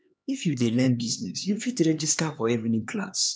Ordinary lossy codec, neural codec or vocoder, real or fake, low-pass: none; codec, 16 kHz, 2 kbps, X-Codec, HuBERT features, trained on LibriSpeech; fake; none